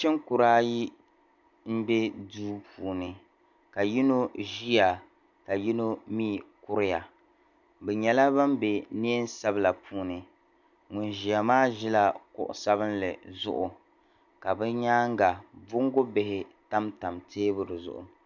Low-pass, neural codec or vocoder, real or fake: 7.2 kHz; none; real